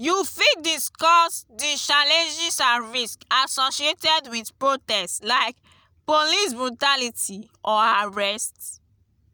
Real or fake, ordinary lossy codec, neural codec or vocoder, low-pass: real; none; none; none